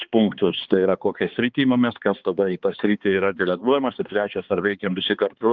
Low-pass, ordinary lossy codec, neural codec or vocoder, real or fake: 7.2 kHz; Opus, 24 kbps; codec, 16 kHz, 2 kbps, X-Codec, HuBERT features, trained on balanced general audio; fake